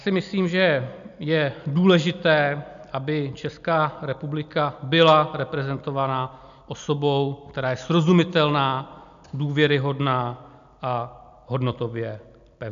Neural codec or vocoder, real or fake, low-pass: none; real; 7.2 kHz